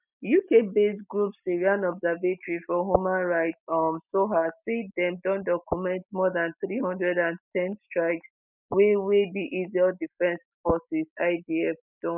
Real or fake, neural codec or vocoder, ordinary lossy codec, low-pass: real; none; none; 3.6 kHz